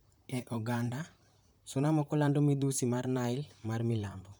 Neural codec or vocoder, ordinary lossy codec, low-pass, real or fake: vocoder, 44.1 kHz, 128 mel bands, Pupu-Vocoder; none; none; fake